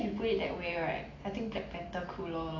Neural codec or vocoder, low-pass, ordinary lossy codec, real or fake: none; 7.2 kHz; AAC, 32 kbps; real